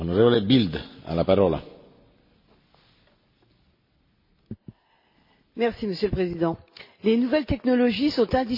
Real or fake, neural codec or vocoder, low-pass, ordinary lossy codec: real; none; 5.4 kHz; MP3, 24 kbps